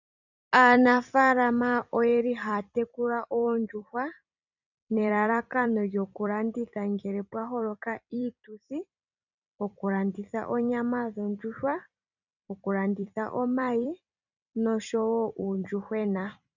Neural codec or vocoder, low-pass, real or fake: vocoder, 44.1 kHz, 128 mel bands every 256 samples, BigVGAN v2; 7.2 kHz; fake